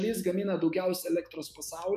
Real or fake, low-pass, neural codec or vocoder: fake; 14.4 kHz; autoencoder, 48 kHz, 128 numbers a frame, DAC-VAE, trained on Japanese speech